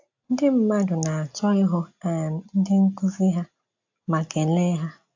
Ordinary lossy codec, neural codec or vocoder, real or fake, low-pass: AAC, 48 kbps; none; real; 7.2 kHz